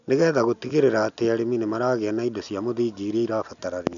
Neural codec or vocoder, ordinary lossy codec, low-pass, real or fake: none; none; 7.2 kHz; real